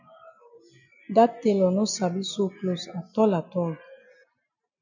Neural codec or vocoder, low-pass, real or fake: none; 7.2 kHz; real